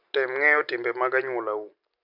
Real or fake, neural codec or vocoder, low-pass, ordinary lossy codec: real; none; 5.4 kHz; none